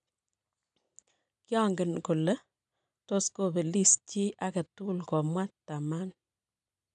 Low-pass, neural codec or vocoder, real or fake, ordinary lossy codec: 9.9 kHz; none; real; none